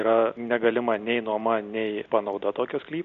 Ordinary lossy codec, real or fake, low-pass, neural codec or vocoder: MP3, 64 kbps; real; 7.2 kHz; none